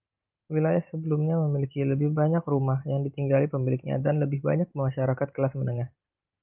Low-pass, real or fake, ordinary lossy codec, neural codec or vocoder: 3.6 kHz; real; Opus, 32 kbps; none